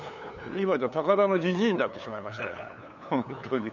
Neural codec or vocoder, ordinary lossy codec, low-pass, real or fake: codec, 16 kHz, 8 kbps, FunCodec, trained on LibriTTS, 25 frames a second; none; 7.2 kHz; fake